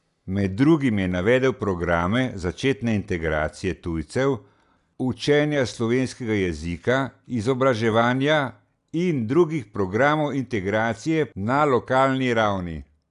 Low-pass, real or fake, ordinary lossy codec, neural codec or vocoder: 10.8 kHz; real; none; none